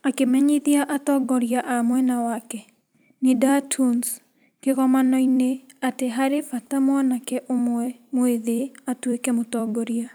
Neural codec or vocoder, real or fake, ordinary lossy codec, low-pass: vocoder, 44.1 kHz, 128 mel bands every 256 samples, BigVGAN v2; fake; none; none